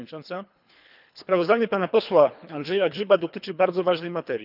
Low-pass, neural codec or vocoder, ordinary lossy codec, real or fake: 5.4 kHz; codec, 24 kHz, 3 kbps, HILCodec; none; fake